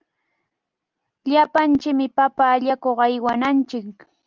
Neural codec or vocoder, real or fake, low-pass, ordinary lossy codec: none; real; 7.2 kHz; Opus, 32 kbps